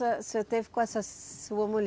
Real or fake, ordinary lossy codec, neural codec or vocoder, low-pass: real; none; none; none